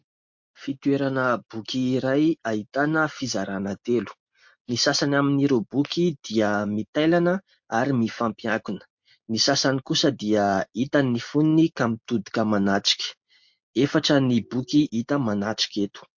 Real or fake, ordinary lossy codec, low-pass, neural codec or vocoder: real; MP3, 48 kbps; 7.2 kHz; none